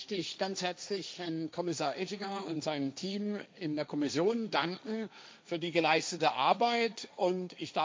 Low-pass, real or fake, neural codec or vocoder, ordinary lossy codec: none; fake; codec, 16 kHz, 1.1 kbps, Voila-Tokenizer; none